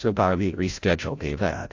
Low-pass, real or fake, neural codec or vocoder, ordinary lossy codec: 7.2 kHz; fake; codec, 16 kHz, 0.5 kbps, FreqCodec, larger model; AAC, 48 kbps